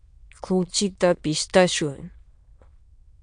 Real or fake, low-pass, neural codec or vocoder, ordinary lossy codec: fake; 9.9 kHz; autoencoder, 22.05 kHz, a latent of 192 numbers a frame, VITS, trained on many speakers; MP3, 64 kbps